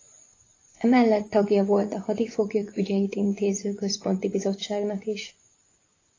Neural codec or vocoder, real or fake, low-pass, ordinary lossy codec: vocoder, 24 kHz, 100 mel bands, Vocos; fake; 7.2 kHz; AAC, 32 kbps